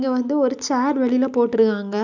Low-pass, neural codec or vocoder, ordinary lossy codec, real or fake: 7.2 kHz; none; none; real